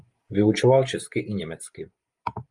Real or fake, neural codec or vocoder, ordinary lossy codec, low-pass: fake; vocoder, 44.1 kHz, 128 mel bands every 512 samples, BigVGAN v2; Opus, 32 kbps; 10.8 kHz